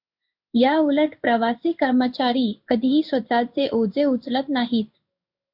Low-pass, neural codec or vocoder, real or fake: 5.4 kHz; codec, 16 kHz in and 24 kHz out, 1 kbps, XY-Tokenizer; fake